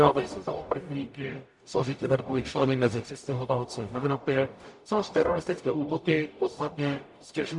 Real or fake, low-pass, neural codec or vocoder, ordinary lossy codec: fake; 10.8 kHz; codec, 44.1 kHz, 0.9 kbps, DAC; MP3, 64 kbps